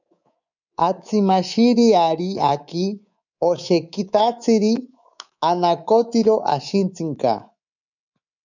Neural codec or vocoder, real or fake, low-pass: codec, 24 kHz, 3.1 kbps, DualCodec; fake; 7.2 kHz